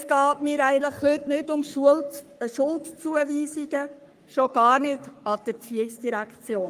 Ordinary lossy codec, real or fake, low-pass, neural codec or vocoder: Opus, 32 kbps; fake; 14.4 kHz; codec, 44.1 kHz, 3.4 kbps, Pupu-Codec